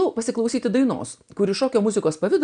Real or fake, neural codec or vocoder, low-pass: real; none; 9.9 kHz